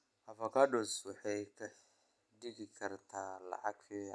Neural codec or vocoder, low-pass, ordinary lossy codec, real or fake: none; none; none; real